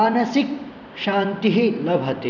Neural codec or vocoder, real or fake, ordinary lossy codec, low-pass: vocoder, 44.1 kHz, 128 mel bands every 256 samples, BigVGAN v2; fake; none; 7.2 kHz